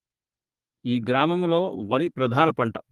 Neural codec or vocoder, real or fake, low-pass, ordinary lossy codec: codec, 32 kHz, 1.9 kbps, SNAC; fake; 14.4 kHz; Opus, 32 kbps